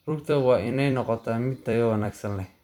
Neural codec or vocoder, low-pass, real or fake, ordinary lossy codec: vocoder, 44.1 kHz, 128 mel bands every 256 samples, BigVGAN v2; 19.8 kHz; fake; none